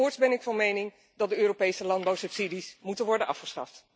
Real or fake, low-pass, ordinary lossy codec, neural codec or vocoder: real; none; none; none